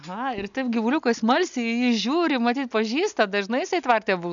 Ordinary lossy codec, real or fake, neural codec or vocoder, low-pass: MP3, 96 kbps; real; none; 7.2 kHz